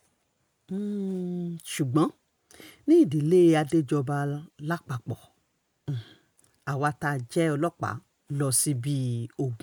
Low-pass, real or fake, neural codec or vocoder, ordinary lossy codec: none; real; none; none